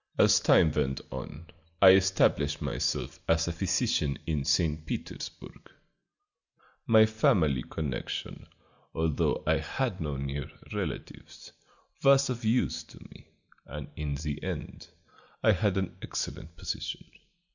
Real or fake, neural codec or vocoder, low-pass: real; none; 7.2 kHz